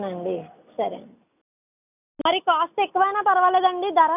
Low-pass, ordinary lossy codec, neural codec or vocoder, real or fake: 3.6 kHz; none; none; real